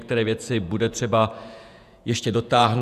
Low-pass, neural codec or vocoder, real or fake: 14.4 kHz; vocoder, 48 kHz, 128 mel bands, Vocos; fake